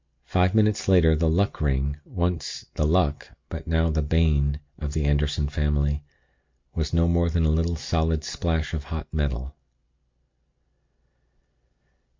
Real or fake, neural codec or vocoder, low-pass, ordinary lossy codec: real; none; 7.2 kHz; MP3, 48 kbps